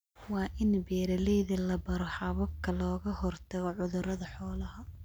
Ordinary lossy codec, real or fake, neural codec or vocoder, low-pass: none; real; none; none